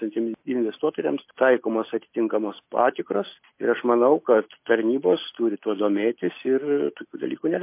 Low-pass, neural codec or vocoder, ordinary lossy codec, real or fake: 3.6 kHz; none; MP3, 24 kbps; real